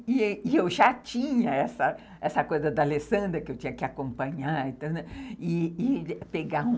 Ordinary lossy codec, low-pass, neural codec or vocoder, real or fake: none; none; none; real